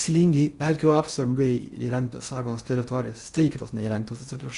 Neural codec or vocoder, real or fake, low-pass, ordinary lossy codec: codec, 16 kHz in and 24 kHz out, 0.6 kbps, FocalCodec, streaming, 4096 codes; fake; 10.8 kHz; Opus, 64 kbps